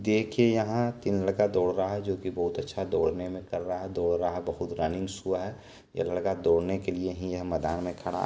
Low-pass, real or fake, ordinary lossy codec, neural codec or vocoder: none; real; none; none